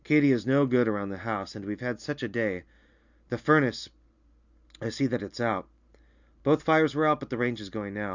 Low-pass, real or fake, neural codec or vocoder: 7.2 kHz; real; none